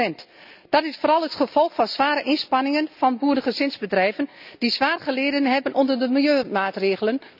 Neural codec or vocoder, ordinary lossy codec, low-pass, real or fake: none; none; 5.4 kHz; real